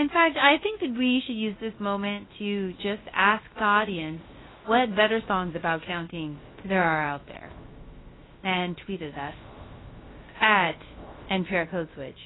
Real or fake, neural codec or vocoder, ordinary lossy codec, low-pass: fake; codec, 16 kHz, about 1 kbps, DyCAST, with the encoder's durations; AAC, 16 kbps; 7.2 kHz